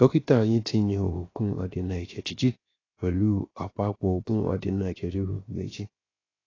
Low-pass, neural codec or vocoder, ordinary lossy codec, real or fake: 7.2 kHz; codec, 16 kHz, 0.7 kbps, FocalCodec; AAC, 32 kbps; fake